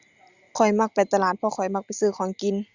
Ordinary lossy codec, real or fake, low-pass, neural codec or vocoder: Opus, 64 kbps; real; 7.2 kHz; none